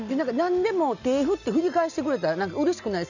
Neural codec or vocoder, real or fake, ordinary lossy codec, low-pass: none; real; none; 7.2 kHz